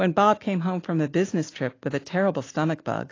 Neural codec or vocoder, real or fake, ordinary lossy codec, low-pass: none; real; AAC, 32 kbps; 7.2 kHz